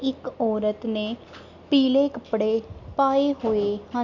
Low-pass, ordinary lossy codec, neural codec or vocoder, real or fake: 7.2 kHz; none; none; real